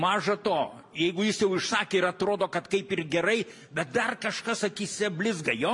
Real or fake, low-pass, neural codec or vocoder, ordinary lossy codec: real; 10.8 kHz; none; MP3, 64 kbps